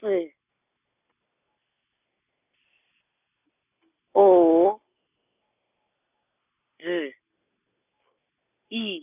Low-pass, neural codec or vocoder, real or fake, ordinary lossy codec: 3.6 kHz; none; real; none